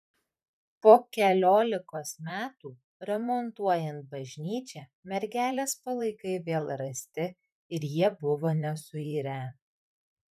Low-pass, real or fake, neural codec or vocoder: 14.4 kHz; fake; vocoder, 44.1 kHz, 128 mel bands, Pupu-Vocoder